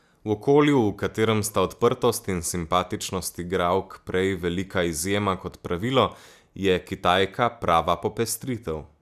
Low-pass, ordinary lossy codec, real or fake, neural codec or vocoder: 14.4 kHz; none; real; none